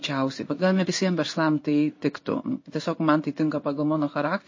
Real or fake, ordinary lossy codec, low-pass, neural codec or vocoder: fake; MP3, 32 kbps; 7.2 kHz; codec, 16 kHz in and 24 kHz out, 1 kbps, XY-Tokenizer